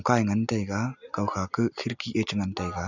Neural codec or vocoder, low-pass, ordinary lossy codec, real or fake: none; 7.2 kHz; none; real